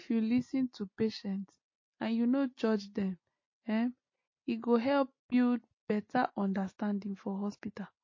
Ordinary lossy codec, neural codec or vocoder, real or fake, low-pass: MP3, 32 kbps; none; real; 7.2 kHz